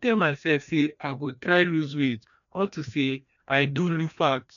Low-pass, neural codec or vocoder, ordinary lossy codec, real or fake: 7.2 kHz; codec, 16 kHz, 1 kbps, FreqCodec, larger model; none; fake